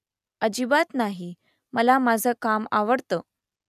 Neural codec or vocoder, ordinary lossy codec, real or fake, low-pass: none; none; real; 14.4 kHz